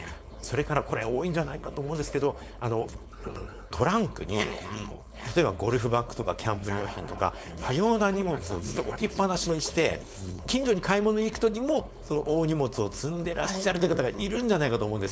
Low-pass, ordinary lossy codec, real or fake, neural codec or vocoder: none; none; fake; codec, 16 kHz, 4.8 kbps, FACodec